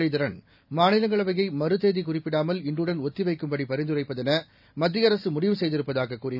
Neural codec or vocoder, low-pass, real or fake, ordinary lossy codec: none; 5.4 kHz; real; none